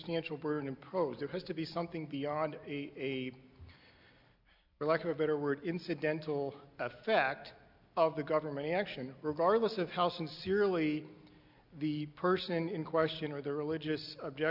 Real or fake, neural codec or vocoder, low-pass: real; none; 5.4 kHz